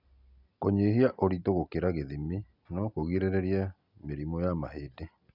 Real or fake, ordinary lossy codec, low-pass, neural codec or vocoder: real; none; 5.4 kHz; none